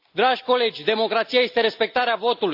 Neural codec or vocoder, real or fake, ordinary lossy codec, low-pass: none; real; AAC, 48 kbps; 5.4 kHz